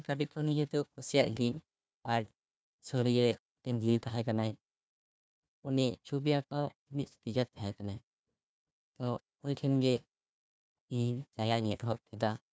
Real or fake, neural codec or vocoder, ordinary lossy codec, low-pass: fake; codec, 16 kHz, 1 kbps, FunCodec, trained on Chinese and English, 50 frames a second; none; none